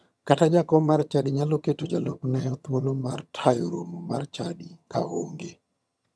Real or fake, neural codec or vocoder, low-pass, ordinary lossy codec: fake; vocoder, 22.05 kHz, 80 mel bands, HiFi-GAN; none; none